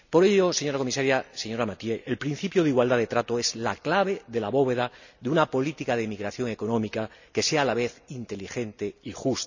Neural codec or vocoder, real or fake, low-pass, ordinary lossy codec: none; real; 7.2 kHz; none